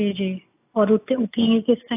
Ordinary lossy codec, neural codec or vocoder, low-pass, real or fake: AAC, 24 kbps; none; 3.6 kHz; real